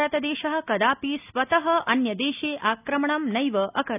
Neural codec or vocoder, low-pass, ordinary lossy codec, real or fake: none; 3.6 kHz; none; real